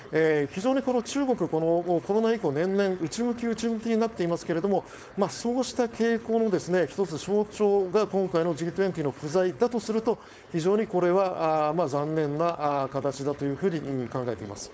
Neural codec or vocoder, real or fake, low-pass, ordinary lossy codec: codec, 16 kHz, 4.8 kbps, FACodec; fake; none; none